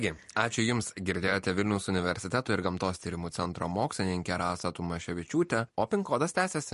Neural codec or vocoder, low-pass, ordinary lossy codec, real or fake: vocoder, 44.1 kHz, 128 mel bands every 256 samples, BigVGAN v2; 14.4 kHz; MP3, 48 kbps; fake